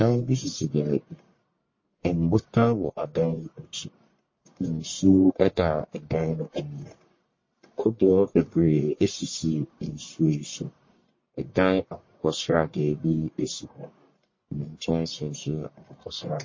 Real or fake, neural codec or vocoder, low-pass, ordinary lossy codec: fake; codec, 44.1 kHz, 1.7 kbps, Pupu-Codec; 7.2 kHz; MP3, 32 kbps